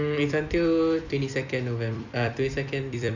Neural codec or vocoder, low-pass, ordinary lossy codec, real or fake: vocoder, 44.1 kHz, 128 mel bands every 256 samples, BigVGAN v2; 7.2 kHz; none; fake